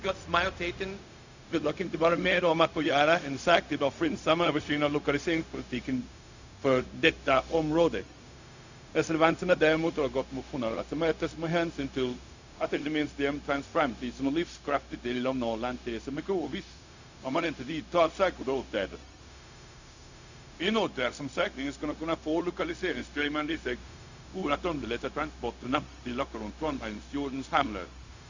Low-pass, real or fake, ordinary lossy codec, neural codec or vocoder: 7.2 kHz; fake; Opus, 64 kbps; codec, 16 kHz, 0.4 kbps, LongCat-Audio-Codec